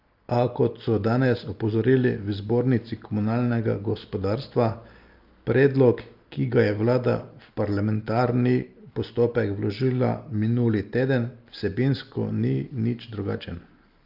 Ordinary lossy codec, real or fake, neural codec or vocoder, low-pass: Opus, 32 kbps; real; none; 5.4 kHz